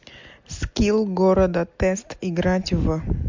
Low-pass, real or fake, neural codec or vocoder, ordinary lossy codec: 7.2 kHz; real; none; MP3, 64 kbps